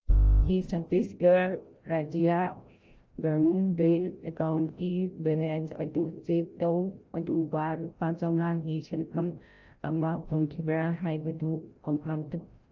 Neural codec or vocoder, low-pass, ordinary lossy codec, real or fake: codec, 16 kHz, 0.5 kbps, FreqCodec, larger model; 7.2 kHz; Opus, 24 kbps; fake